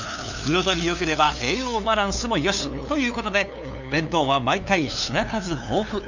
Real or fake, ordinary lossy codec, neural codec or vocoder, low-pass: fake; none; codec, 16 kHz, 2 kbps, FunCodec, trained on LibriTTS, 25 frames a second; 7.2 kHz